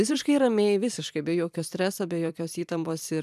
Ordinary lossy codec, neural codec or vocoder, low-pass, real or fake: MP3, 96 kbps; vocoder, 44.1 kHz, 128 mel bands every 256 samples, BigVGAN v2; 14.4 kHz; fake